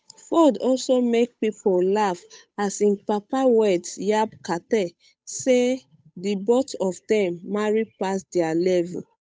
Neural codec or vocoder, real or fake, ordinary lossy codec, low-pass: codec, 16 kHz, 8 kbps, FunCodec, trained on Chinese and English, 25 frames a second; fake; none; none